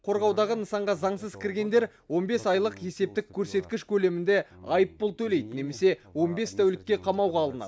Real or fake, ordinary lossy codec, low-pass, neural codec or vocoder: real; none; none; none